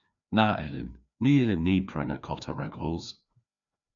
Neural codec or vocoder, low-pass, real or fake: codec, 16 kHz, 2 kbps, FreqCodec, larger model; 7.2 kHz; fake